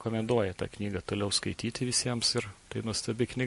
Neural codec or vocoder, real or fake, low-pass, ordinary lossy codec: none; real; 14.4 kHz; MP3, 48 kbps